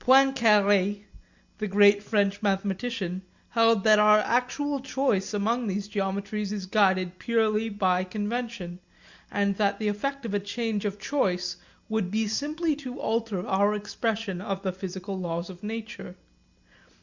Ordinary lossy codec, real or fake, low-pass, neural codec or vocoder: Opus, 64 kbps; real; 7.2 kHz; none